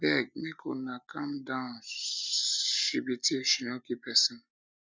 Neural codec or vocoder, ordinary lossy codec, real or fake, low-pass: none; none; real; none